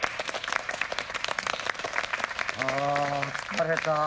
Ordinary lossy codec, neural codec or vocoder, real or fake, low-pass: none; none; real; none